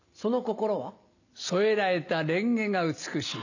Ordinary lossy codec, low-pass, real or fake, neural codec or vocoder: none; 7.2 kHz; real; none